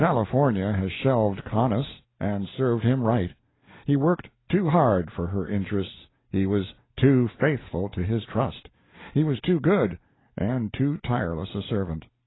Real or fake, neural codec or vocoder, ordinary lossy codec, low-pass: real; none; AAC, 16 kbps; 7.2 kHz